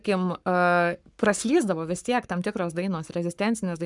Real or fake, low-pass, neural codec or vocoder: fake; 10.8 kHz; codec, 44.1 kHz, 7.8 kbps, Pupu-Codec